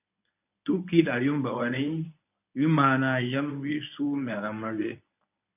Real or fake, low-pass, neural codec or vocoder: fake; 3.6 kHz; codec, 24 kHz, 0.9 kbps, WavTokenizer, medium speech release version 1